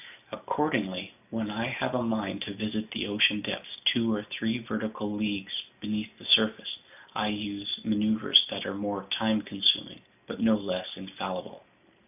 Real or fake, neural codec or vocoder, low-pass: real; none; 3.6 kHz